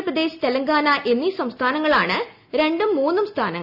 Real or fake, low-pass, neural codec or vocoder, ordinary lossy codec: real; 5.4 kHz; none; none